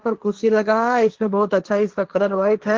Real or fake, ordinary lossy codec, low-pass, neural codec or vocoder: fake; Opus, 16 kbps; 7.2 kHz; codec, 16 kHz, 1.1 kbps, Voila-Tokenizer